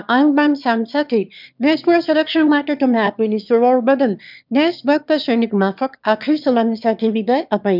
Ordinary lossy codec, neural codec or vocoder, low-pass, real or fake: none; autoencoder, 22.05 kHz, a latent of 192 numbers a frame, VITS, trained on one speaker; 5.4 kHz; fake